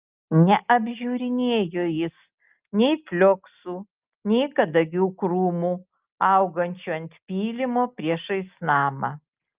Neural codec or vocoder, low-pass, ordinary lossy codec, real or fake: none; 3.6 kHz; Opus, 24 kbps; real